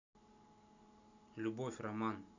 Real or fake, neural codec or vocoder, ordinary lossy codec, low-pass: real; none; none; 7.2 kHz